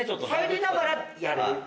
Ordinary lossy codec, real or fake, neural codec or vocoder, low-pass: none; real; none; none